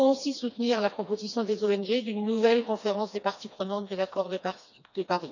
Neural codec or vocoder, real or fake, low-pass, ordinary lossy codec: codec, 16 kHz, 2 kbps, FreqCodec, smaller model; fake; 7.2 kHz; none